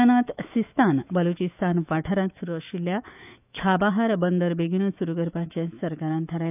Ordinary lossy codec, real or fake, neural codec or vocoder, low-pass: none; fake; autoencoder, 48 kHz, 128 numbers a frame, DAC-VAE, trained on Japanese speech; 3.6 kHz